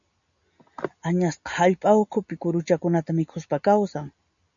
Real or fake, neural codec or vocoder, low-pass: real; none; 7.2 kHz